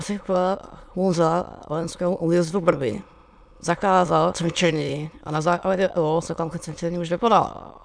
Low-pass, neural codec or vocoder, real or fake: 9.9 kHz; autoencoder, 22.05 kHz, a latent of 192 numbers a frame, VITS, trained on many speakers; fake